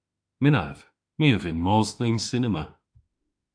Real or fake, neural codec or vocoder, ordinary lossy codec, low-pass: fake; autoencoder, 48 kHz, 32 numbers a frame, DAC-VAE, trained on Japanese speech; AAC, 64 kbps; 9.9 kHz